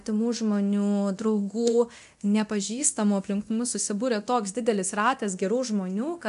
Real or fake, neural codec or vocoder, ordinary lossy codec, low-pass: fake; codec, 24 kHz, 0.9 kbps, DualCodec; MP3, 96 kbps; 10.8 kHz